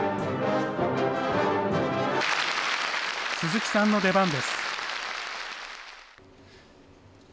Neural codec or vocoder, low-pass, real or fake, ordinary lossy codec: none; none; real; none